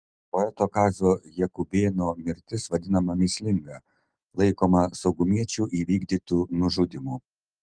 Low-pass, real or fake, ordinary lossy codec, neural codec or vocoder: 9.9 kHz; real; Opus, 24 kbps; none